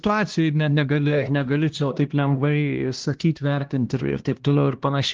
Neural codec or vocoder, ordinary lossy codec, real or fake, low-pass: codec, 16 kHz, 1 kbps, X-Codec, HuBERT features, trained on LibriSpeech; Opus, 16 kbps; fake; 7.2 kHz